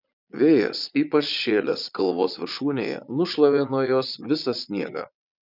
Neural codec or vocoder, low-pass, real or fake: vocoder, 22.05 kHz, 80 mel bands, Vocos; 5.4 kHz; fake